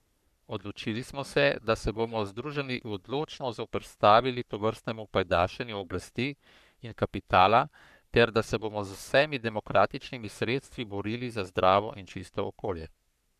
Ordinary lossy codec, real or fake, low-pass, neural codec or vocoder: none; fake; 14.4 kHz; codec, 44.1 kHz, 3.4 kbps, Pupu-Codec